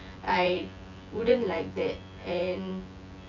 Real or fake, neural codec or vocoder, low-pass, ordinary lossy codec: fake; vocoder, 24 kHz, 100 mel bands, Vocos; 7.2 kHz; AAC, 48 kbps